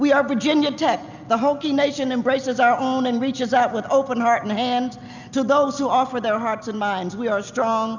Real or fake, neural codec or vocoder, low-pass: real; none; 7.2 kHz